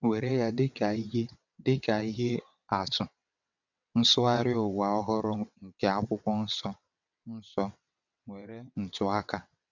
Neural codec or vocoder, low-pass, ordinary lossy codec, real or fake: vocoder, 22.05 kHz, 80 mel bands, WaveNeXt; 7.2 kHz; none; fake